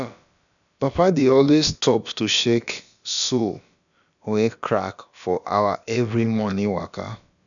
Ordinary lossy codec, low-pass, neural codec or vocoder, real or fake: none; 7.2 kHz; codec, 16 kHz, about 1 kbps, DyCAST, with the encoder's durations; fake